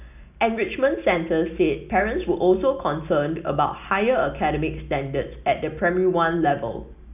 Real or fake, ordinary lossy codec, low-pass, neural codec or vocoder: real; none; 3.6 kHz; none